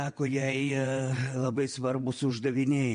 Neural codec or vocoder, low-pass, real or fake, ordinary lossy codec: vocoder, 22.05 kHz, 80 mel bands, WaveNeXt; 9.9 kHz; fake; MP3, 48 kbps